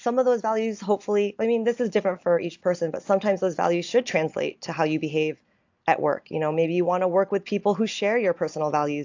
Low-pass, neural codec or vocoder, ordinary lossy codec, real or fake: 7.2 kHz; none; AAC, 48 kbps; real